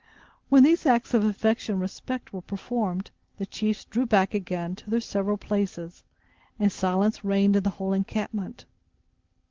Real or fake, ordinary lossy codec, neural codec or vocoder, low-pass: real; Opus, 16 kbps; none; 7.2 kHz